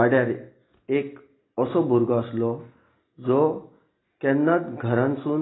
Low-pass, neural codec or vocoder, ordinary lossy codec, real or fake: 7.2 kHz; none; AAC, 16 kbps; real